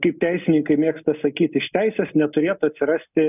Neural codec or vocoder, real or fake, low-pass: none; real; 3.6 kHz